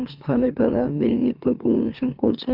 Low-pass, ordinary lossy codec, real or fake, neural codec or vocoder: 5.4 kHz; Opus, 24 kbps; fake; autoencoder, 44.1 kHz, a latent of 192 numbers a frame, MeloTTS